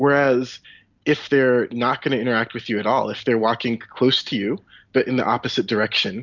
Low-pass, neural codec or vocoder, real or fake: 7.2 kHz; none; real